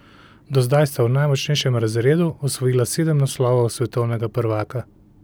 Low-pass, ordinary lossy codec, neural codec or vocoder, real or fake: none; none; none; real